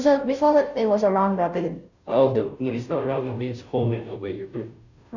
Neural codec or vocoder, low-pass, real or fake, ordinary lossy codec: codec, 16 kHz, 0.5 kbps, FunCodec, trained on Chinese and English, 25 frames a second; 7.2 kHz; fake; none